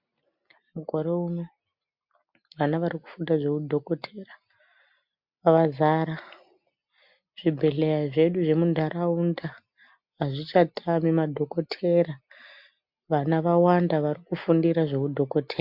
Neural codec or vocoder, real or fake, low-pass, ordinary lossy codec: none; real; 5.4 kHz; MP3, 48 kbps